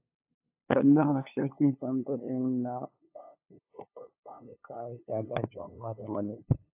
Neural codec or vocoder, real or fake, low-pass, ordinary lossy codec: codec, 16 kHz, 2 kbps, FunCodec, trained on LibriTTS, 25 frames a second; fake; 3.6 kHz; AAC, 32 kbps